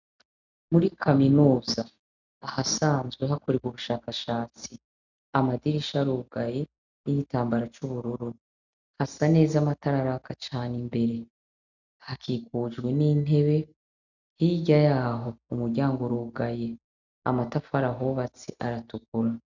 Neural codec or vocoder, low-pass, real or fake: none; 7.2 kHz; real